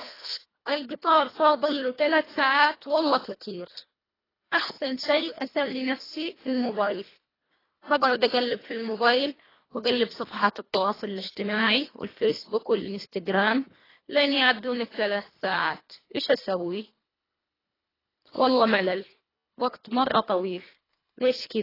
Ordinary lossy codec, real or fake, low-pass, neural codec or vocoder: AAC, 24 kbps; fake; 5.4 kHz; codec, 24 kHz, 1.5 kbps, HILCodec